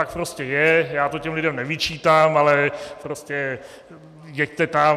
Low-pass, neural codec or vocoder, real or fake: 14.4 kHz; none; real